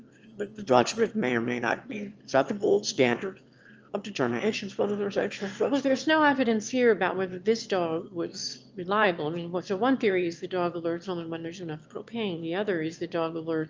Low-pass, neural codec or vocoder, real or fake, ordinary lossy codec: 7.2 kHz; autoencoder, 22.05 kHz, a latent of 192 numbers a frame, VITS, trained on one speaker; fake; Opus, 24 kbps